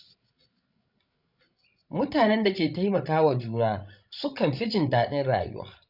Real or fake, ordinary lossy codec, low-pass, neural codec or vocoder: fake; none; 5.4 kHz; codec, 16 kHz, 16 kbps, FreqCodec, larger model